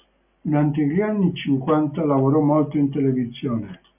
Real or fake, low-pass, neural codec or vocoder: real; 3.6 kHz; none